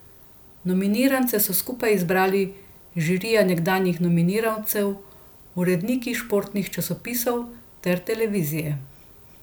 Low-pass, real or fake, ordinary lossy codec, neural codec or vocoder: none; real; none; none